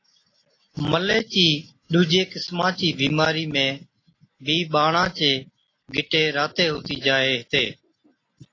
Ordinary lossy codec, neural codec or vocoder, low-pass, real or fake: AAC, 32 kbps; none; 7.2 kHz; real